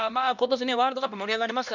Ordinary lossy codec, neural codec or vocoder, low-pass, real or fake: none; codec, 16 kHz, 1 kbps, X-Codec, HuBERT features, trained on LibriSpeech; 7.2 kHz; fake